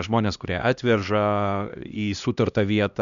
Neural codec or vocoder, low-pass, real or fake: codec, 16 kHz, 1 kbps, X-Codec, HuBERT features, trained on LibriSpeech; 7.2 kHz; fake